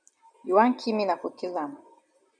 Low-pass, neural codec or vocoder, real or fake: 9.9 kHz; none; real